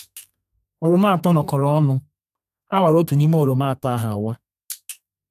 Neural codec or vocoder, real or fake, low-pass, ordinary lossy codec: codec, 32 kHz, 1.9 kbps, SNAC; fake; 14.4 kHz; none